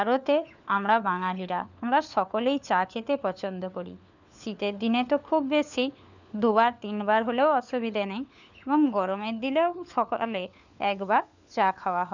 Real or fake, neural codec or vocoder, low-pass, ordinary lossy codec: fake; codec, 16 kHz, 4 kbps, FunCodec, trained on LibriTTS, 50 frames a second; 7.2 kHz; none